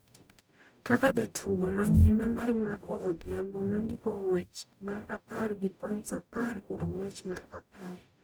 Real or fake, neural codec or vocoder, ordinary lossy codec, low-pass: fake; codec, 44.1 kHz, 0.9 kbps, DAC; none; none